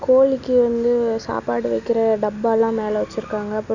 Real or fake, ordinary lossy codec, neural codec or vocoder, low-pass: real; none; none; 7.2 kHz